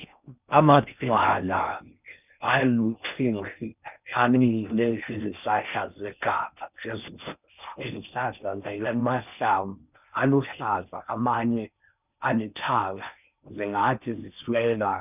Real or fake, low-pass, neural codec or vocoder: fake; 3.6 kHz; codec, 16 kHz in and 24 kHz out, 0.6 kbps, FocalCodec, streaming, 4096 codes